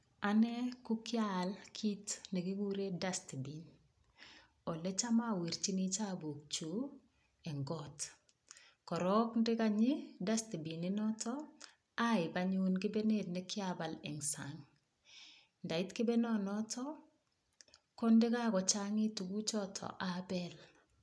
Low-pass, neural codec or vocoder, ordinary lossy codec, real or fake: none; none; none; real